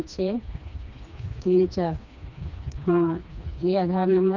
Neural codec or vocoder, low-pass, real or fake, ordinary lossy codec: codec, 16 kHz, 2 kbps, FreqCodec, smaller model; 7.2 kHz; fake; none